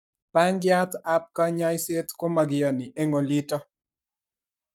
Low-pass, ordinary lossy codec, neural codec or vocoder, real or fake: 19.8 kHz; none; codec, 44.1 kHz, 7.8 kbps, Pupu-Codec; fake